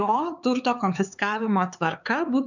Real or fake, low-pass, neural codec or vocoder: fake; 7.2 kHz; codec, 16 kHz, 4 kbps, X-Codec, HuBERT features, trained on LibriSpeech